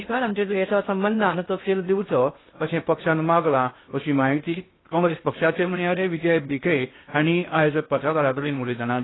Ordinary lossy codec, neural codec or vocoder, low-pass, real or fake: AAC, 16 kbps; codec, 16 kHz in and 24 kHz out, 0.6 kbps, FocalCodec, streaming, 2048 codes; 7.2 kHz; fake